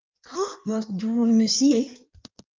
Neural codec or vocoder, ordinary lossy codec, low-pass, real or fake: codec, 16 kHz in and 24 kHz out, 1.1 kbps, FireRedTTS-2 codec; Opus, 32 kbps; 7.2 kHz; fake